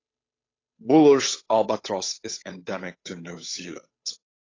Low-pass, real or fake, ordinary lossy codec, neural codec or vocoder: 7.2 kHz; fake; AAC, 32 kbps; codec, 16 kHz, 8 kbps, FunCodec, trained on Chinese and English, 25 frames a second